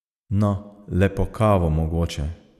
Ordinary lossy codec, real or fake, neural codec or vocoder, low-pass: none; real; none; 14.4 kHz